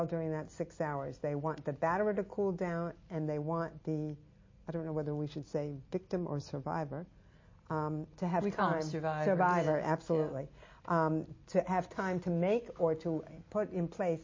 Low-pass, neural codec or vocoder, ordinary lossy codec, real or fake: 7.2 kHz; none; MP3, 32 kbps; real